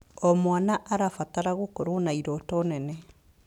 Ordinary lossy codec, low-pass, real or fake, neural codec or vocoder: none; 19.8 kHz; real; none